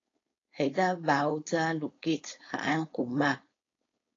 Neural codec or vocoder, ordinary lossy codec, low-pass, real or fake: codec, 16 kHz, 4.8 kbps, FACodec; AAC, 32 kbps; 7.2 kHz; fake